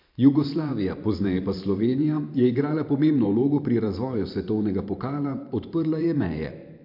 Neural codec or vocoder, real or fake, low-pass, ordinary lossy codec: vocoder, 44.1 kHz, 128 mel bands every 512 samples, BigVGAN v2; fake; 5.4 kHz; MP3, 48 kbps